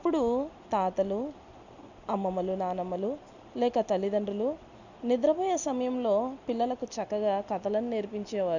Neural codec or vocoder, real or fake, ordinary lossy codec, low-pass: none; real; none; 7.2 kHz